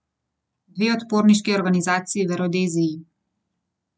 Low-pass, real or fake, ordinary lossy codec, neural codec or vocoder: none; real; none; none